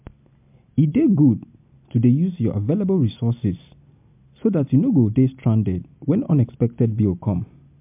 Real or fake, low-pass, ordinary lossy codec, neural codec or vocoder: real; 3.6 kHz; MP3, 32 kbps; none